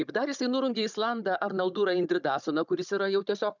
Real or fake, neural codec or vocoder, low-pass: fake; codec, 16 kHz, 16 kbps, FunCodec, trained on Chinese and English, 50 frames a second; 7.2 kHz